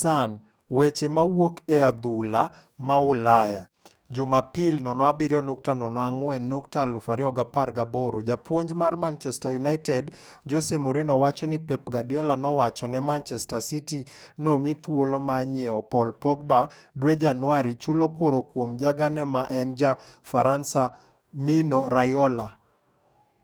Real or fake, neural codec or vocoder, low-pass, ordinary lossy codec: fake; codec, 44.1 kHz, 2.6 kbps, DAC; none; none